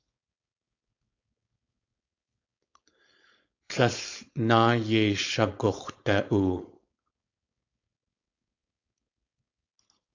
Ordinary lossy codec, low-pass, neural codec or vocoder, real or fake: AAC, 48 kbps; 7.2 kHz; codec, 16 kHz, 4.8 kbps, FACodec; fake